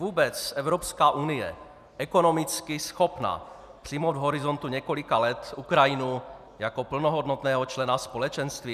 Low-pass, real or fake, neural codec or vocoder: 14.4 kHz; real; none